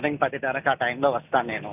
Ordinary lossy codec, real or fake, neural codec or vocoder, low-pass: none; real; none; 3.6 kHz